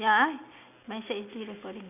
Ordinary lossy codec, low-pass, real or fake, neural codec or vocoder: none; 3.6 kHz; real; none